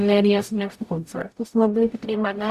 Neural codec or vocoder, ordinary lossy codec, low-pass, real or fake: codec, 44.1 kHz, 0.9 kbps, DAC; Opus, 64 kbps; 14.4 kHz; fake